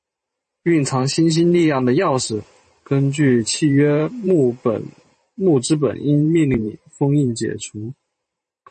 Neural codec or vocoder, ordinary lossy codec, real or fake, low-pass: vocoder, 44.1 kHz, 128 mel bands every 512 samples, BigVGAN v2; MP3, 32 kbps; fake; 10.8 kHz